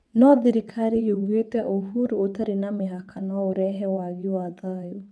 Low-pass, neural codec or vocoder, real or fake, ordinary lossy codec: none; vocoder, 22.05 kHz, 80 mel bands, WaveNeXt; fake; none